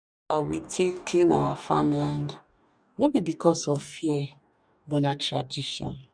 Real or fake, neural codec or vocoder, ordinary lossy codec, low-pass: fake; codec, 44.1 kHz, 2.6 kbps, DAC; none; 9.9 kHz